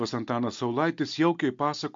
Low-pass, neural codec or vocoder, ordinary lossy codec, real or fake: 7.2 kHz; none; MP3, 48 kbps; real